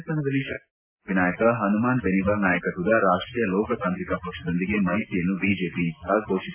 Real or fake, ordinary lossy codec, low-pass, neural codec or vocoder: real; AAC, 32 kbps; 3.6 kHz; none